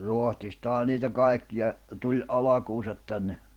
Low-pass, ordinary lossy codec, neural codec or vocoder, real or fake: 19.8 kHz; Opus, 16 kbps; vocoder, 44.1 kHz, 128 mel bands every 512 samples, BigVGAN v2; fake